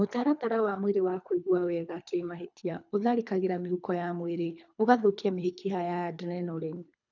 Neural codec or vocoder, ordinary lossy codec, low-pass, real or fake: codec, 24 kHz, 3 kbps, HILCodec; none; 7.2 kHz; fake